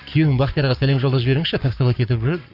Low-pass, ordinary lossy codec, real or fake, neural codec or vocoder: 5.4 kHz; Opus, 64 kbps; fake; codec, 44.1 kHz, 7.8 kbps, Pupu-Codec